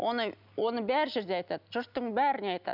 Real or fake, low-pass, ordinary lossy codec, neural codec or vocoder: real; 5.4 kHz; none; none